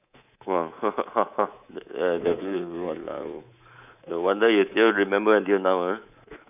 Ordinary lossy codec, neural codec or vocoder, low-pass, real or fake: none; codec, 24 kHz, 3.1 kbps, DualCodec; 3.6 kHz; fake